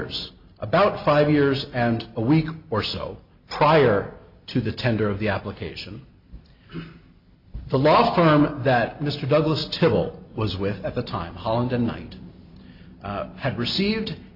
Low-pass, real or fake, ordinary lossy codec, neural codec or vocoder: 5.4 kHz; real; MP3, 48 kbps; none